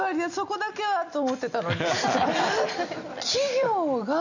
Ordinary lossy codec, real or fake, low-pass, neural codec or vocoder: none; real; 7.2 kHz; none